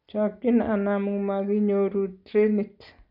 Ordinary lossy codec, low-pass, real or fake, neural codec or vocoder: none; 5.4 kHz; real; none